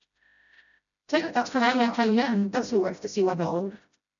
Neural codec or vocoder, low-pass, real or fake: codec, 16 kHz, 0.5 kbps, FreqCodec, smaller model; 7.2 kHz; fake